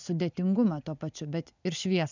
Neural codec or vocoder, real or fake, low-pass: none; real; 7.2 kHz